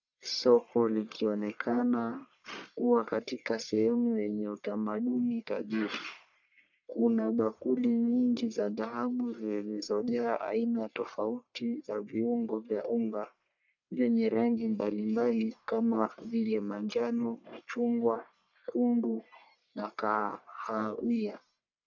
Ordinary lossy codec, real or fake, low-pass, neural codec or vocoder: MP3, 64 kbps; fake; 7.2 kHz; codec, 44.1 kHz, 1.7 kbps, Pupu-Codec